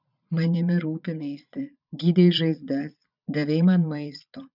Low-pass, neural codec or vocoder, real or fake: 5.4 kHz; vocoder, 22.05 kHz, 80 mel bands, Vocos; fake